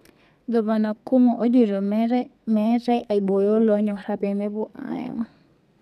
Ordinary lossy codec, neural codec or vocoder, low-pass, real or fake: none; codec, 32 kHz, 1.9 kbps, SNAC; 14.4 kHz; fake